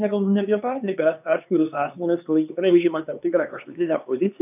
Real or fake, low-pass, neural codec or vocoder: fake; 3.6 kHz; codec, 16 kHz, 2 kbps, X-Codec, HuBERT features, trained on LibriSpeech